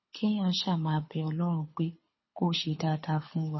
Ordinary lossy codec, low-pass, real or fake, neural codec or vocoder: MP3, 24 kbps; 7.2 kHz; fake; codec, 24 kHz, 6 kbps, HILCodec